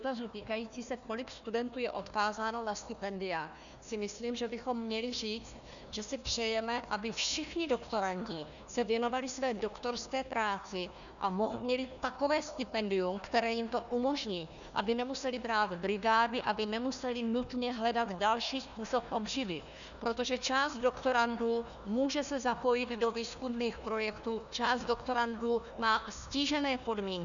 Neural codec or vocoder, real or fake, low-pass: codec, 16 kHz, 1 kbps, FunCodec, trained on Chinese and English, 50 frames a second; fake; 7.2 kHz